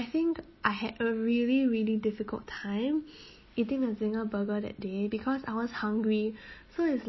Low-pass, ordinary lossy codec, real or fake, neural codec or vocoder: 7.2 kHz; MP3, 24 kbps; real; none